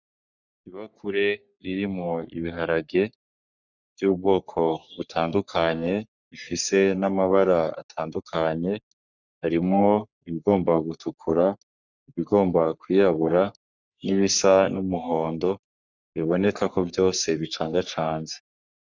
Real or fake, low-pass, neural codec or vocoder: fake; 7.2 kHz; codec, 44.1 kHz, 3.4 kbps, Pupu-Codec